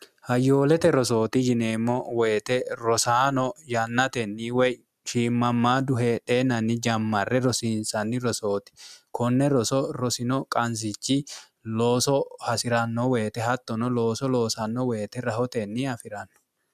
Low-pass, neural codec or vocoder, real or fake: 14.4 kHz; none; real